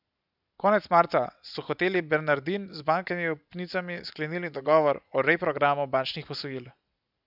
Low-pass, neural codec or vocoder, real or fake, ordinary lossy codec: 5.4 kHz; none; real; none